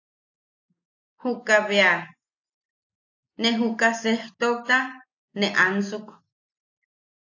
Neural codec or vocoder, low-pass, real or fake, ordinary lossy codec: none; 7.2 kHz; real; AAC, 48 kbps